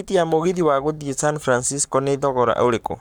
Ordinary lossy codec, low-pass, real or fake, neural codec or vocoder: none; none; fake; codec, 44.1 kHz, 7.8 kbps, Pupu-Codec